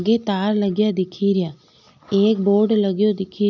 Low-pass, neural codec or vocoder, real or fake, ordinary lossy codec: 7.2 kHz; none; real; none